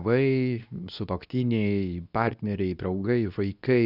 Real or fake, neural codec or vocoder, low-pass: fake; codec, 24 kHz, 0.9 kbps, WavTokenizer, medium speech release version 2; 5.4 kHz